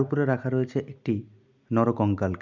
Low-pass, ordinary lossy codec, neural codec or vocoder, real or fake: 7.2 kHz; MP3, 64 kbps; none; real